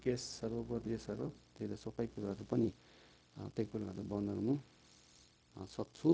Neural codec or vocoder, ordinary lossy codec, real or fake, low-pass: codec, 16 kHz, 0.4 kbps, LongCat-Audio-Codec; none; fake; none